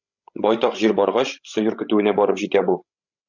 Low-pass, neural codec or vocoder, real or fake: 7.2 kHz; codec, 16 kHz, 16 kbps, FreqCodec, larger model; fake